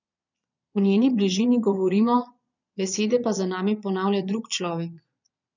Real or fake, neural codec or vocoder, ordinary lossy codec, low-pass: fake; vocoder, 22.05 kHz, 80 mel bands, Vocos; none; 7.2 kHz